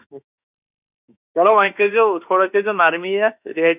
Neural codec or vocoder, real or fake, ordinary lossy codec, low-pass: autoencoder, 48 kHz, 32 numbers a frame, DAC-VAE, trained on Japanese speech; fake; none; 3.6 kHz